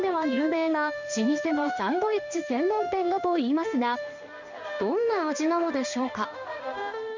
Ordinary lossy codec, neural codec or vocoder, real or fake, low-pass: none; codec, 16 kHz in and 24 kHz out, 1 kbps, XY-Tokenizer; fake; 7.2 kHz